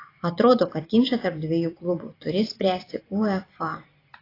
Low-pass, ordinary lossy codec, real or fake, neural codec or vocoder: 5.4 kHz; AAC, 24 kbps; fake; vocoder, 44.1 kHz, 128 mel bands every 256 samples, BigVGAN v2